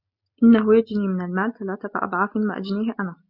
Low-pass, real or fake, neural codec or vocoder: 5.4 kHz; real; none